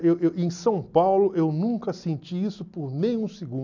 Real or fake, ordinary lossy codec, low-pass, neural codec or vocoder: real; none; 7.2 kHz; none